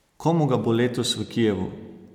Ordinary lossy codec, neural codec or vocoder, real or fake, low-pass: MP3, 96 kbps; none; real; 19.8 kHz